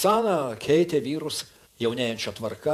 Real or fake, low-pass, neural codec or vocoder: fake; 14.4 kHz; vocoder, 48 kHz, 128 mel bands, Vocos